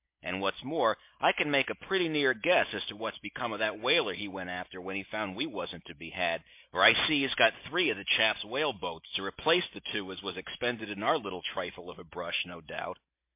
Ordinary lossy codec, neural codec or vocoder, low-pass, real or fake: MP3, 32 kbps; none; 3.6 kHz; real